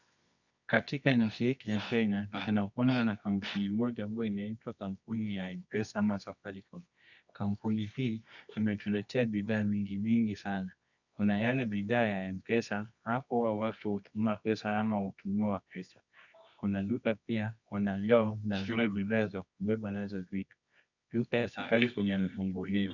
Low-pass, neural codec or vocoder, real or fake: 7.2 kHz; codec, 24 kHz, 0.9 kbps, WavTokenizer, medium music audio release; fake